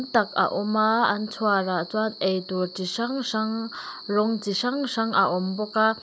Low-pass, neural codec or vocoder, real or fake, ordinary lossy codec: none; none; real; none